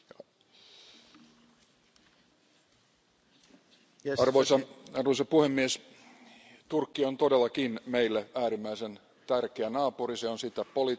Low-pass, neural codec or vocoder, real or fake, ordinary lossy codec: none; none; real; none